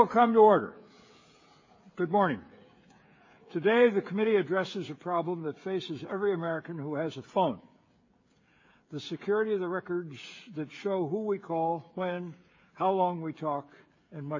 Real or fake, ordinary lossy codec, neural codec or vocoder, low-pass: fake; MP3, 32 kbps; codec, 16 kHz, 16 kbps, FreqCodec, smaller model; 7.2 kHz